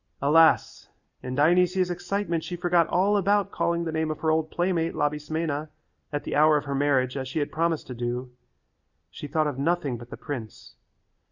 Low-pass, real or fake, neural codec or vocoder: 7.2 kHz; real; none